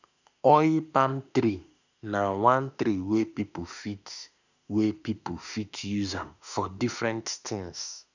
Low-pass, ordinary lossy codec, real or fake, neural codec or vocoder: 7.2 kHz; none; fake; autoencoder, 48 kHz, 32 numbers a frame, DAC-VAE, trained on Japanese speech